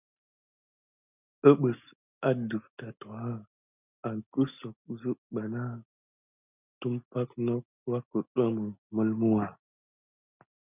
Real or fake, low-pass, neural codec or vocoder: real; 3.6 kHz; none